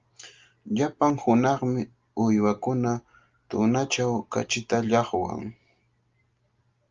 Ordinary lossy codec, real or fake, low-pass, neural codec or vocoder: Opus, 32 kbps; real; 7.2 kHz; none